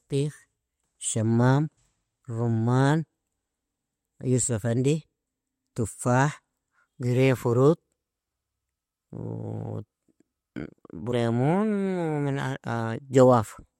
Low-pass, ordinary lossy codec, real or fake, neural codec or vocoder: 19.8 kHz; MP3, 64 kbps; real; none